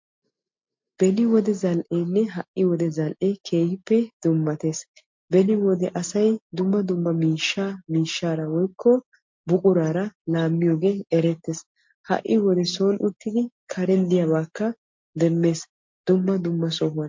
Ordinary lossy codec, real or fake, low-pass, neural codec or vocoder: MP3, 48 kbps; real; 7.2 kHz; none